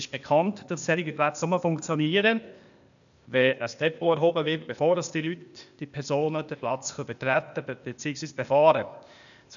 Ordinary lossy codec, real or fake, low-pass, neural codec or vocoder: none; fake; 7.2 kHz; codec, 16 kHz, 0.8 kbps, ZipCodec